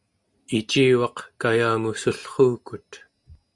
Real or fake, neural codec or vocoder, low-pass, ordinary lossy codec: real; none; 10.8 kHz; Opus, 64 kbps